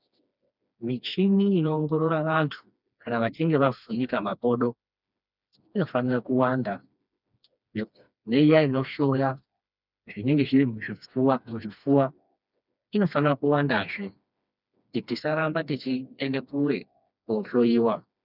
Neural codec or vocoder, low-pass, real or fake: codec, 16 kHz, 2 kbps, FreqCodec, smaller model; 5.4 kHz; fake